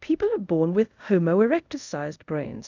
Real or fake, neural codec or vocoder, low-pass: fake; codec, 24 kHz, 0.5 kbps, DualCodec; 7.2 kHz